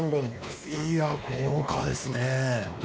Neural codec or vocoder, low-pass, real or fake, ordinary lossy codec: codec, 16 kHz, 2 kbps, X-Codec, WavLM features, trained on Multilingual LibriSpeech; none; fake; none